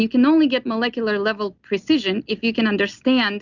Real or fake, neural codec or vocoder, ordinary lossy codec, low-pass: real; none; Opus, 64 kbps; 7.2 kHz